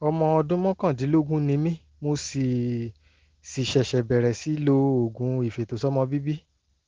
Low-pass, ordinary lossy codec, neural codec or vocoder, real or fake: 7.2 kHz; Opus, 16 kbps; none; real